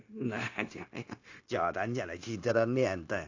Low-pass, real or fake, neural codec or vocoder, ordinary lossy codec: 7.2 kHz; fake; codec, 16 kHz, 0.9 kbps, LongCat-Audio-Codec; AAC, 48 kbps